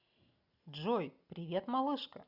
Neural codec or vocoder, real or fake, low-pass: none; real; 5.4 kHz